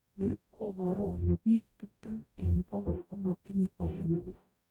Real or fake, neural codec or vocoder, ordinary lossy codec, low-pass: fake; codec, 44.1 kHz, 0.9 kbps, DAC; none; 19.8 kHz